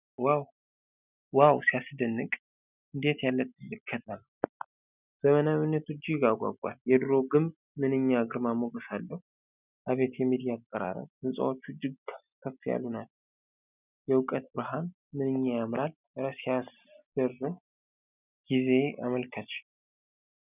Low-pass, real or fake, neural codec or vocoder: 3.6 kHz; real; none